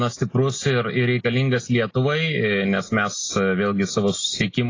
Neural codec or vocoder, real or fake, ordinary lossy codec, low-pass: none; real; AAC, 32 kbps; 7.2 kHz